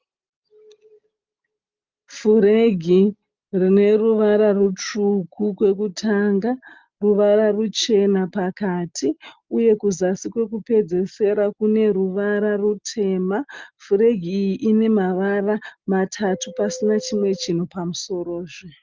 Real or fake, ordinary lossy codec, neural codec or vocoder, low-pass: real; Opus, 32 kbps; none; 7.2 kHz